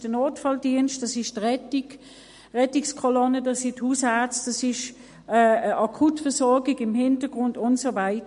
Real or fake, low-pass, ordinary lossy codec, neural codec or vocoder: real; 10.8 kHz; MP3, 48 kbps; none